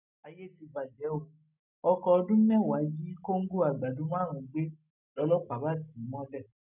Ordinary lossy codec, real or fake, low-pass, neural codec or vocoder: none; real; 3.6 kHz; none